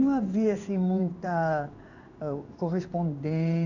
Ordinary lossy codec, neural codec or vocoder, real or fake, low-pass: none; codec, 16 kHz in and 24 kHz out, 1 kbps, XY-Tokenizer; fake; 7.2 kHz